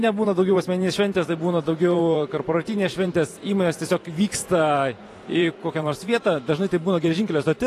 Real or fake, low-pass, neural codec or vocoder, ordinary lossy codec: fake; 14.4 kHz; vocoder, 44.1 kHz, 128 mel bands every 512 samples, BigVGAN v2; AAC, 48 kbps